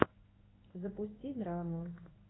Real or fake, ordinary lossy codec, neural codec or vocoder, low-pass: fake; AAC, 16 kbps; codec, 16 kHz in and 24 kHz out, 1 kbps, XY-Tokenizer; 7.2 kHz